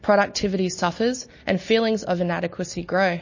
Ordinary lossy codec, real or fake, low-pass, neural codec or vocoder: MP3, 32 kbps; real; 7.2 kHz; none